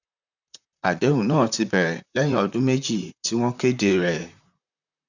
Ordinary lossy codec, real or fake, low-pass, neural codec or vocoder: none; fake; 7.2 kHz; vocoder, 44.1 kHz, 128 mel bands, Pupu-Vocoder